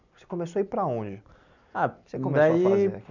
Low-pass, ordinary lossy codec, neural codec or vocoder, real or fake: 7.2 kHz; none; none; real